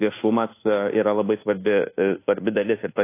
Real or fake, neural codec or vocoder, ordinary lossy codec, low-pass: fake; codec, 24 kHz, 1.2 kbps, DualCodec; AAC, 24 kbps; 3.6 kHz